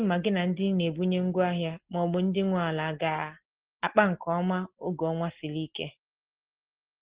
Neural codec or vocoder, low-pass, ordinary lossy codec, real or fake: none; 3.6 kHz; Opus, 16 kbps; real